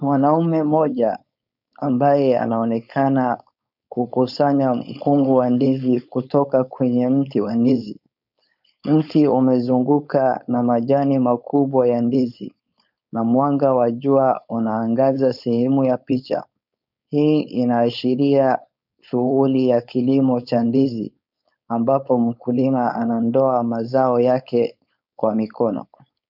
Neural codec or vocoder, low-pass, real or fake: codec, 16 kHz, 4.8 kbps, FACodec; 5.4 kHz; fake